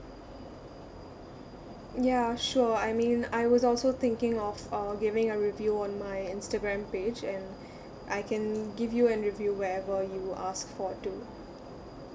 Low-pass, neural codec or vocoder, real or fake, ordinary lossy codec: none; none; real; none